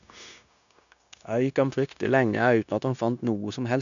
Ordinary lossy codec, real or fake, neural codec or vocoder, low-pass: none; fake; codec, 16 kHz, 0.9 kbps, LongCat-Audio-Codec; 7.2 kHz